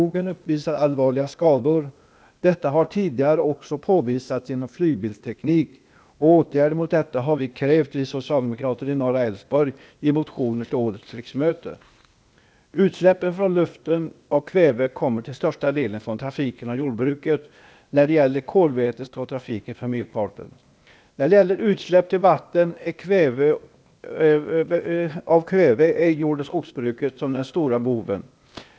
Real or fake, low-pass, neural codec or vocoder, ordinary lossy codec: fake; none; codec, 16 kHz, 0.8 kbps, ZipCodec; none